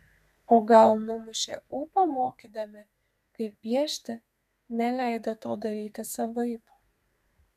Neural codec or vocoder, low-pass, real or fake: codec, 32 kHz, 1.9 kbps, SNAC; 14.4 kHz; fake